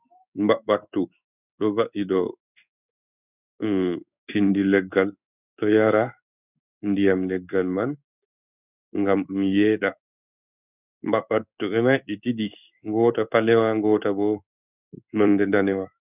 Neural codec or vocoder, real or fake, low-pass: codec, 16 kHz in and 24 kHz out, 1 kbps, XY-Tokenizer; fake; 3.6 kHz